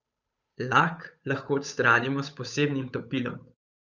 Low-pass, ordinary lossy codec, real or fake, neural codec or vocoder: 7.2 kHz; none; fake; codec, 16 kHz, 8 kbps, FunCodec, trained on Chinese and English, 25 frames a second